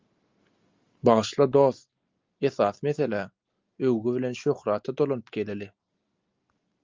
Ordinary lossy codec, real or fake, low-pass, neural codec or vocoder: Opus, 32 kbps; real; 7.2 kHz; none